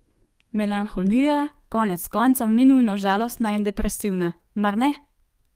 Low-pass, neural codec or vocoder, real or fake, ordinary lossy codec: 14.4 kHz; codec, 32 kHz, 1.9 kbps, SNAC; fake; Opus, 32 kbps